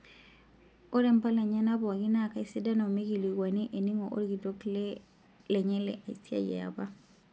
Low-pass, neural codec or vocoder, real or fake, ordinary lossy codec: none; none; real; none